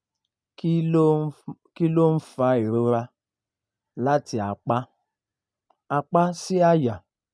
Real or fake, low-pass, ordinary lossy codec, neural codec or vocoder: real; none; none; none